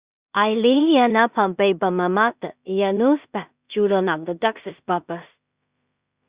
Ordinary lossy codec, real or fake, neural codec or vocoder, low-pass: Opus, 64 kbps; fake; codec, 16 kHz in and 24 kHz out, 0.4 kbps, LongCat-Audio-Codec, two codebook decoder; 3.6 kHz